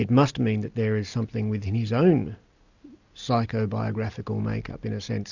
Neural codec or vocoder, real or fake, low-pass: none; real; 7.2 kHz